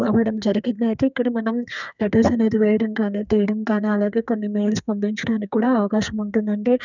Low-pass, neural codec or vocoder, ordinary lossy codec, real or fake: 7.2 kHz; codec, 32 kHz, 1.9 kbps, SNAC; none; fake